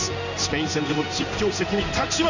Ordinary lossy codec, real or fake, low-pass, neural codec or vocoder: none; fake; 7.2 kHz; codec, 16 kHz in and 24 kHz out, 1 kbps, XY-Tokenizer